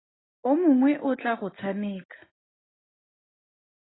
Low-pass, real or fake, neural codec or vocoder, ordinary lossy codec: 7.2 kHz; real; none; AAC, 16 kbps